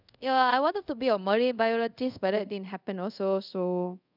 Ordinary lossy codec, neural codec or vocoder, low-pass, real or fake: none; codec, 24 kHz, 0.5 kbps, DualCodec; 5.4 kHz; fake